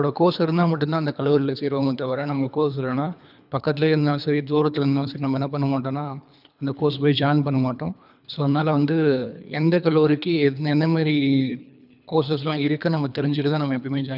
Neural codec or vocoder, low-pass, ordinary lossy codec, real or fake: codec, 24 kHz, 3 kbps, HILCodec; 5.4 kHz; none; fake